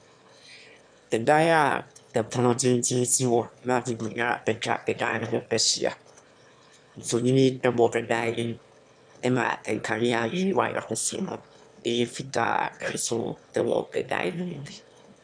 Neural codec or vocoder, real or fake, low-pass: autoencoder, 22.05 kHz, a latent of 192 numbers a frame, VITS, trained on one speaker; fake; 9.9 kHz